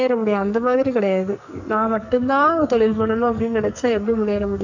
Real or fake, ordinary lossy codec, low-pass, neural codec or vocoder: fake; none; 7.2 kHz; codec, 44.1 kHz, 2.6 kbps, SNAC